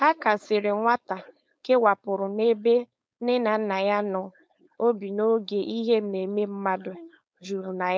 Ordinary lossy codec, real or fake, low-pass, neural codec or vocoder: none; fake; none; codec, 16 kHz, 4.8 kbps, FACodec